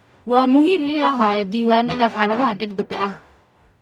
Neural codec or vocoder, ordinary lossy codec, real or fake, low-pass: codec, 44.1 kHz, 0.9 kbps, DAC; none; fake; 19.8 kHz